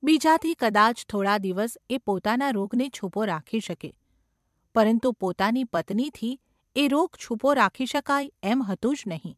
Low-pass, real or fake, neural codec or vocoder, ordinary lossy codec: 14.4 kHz; fake; vocoder, 44.1 kHz, 128 mel bands every 256 samples, BigVGAN v2; MP3, 96 kbps